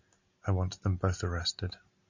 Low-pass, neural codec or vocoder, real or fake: 7.2 kHz; none; real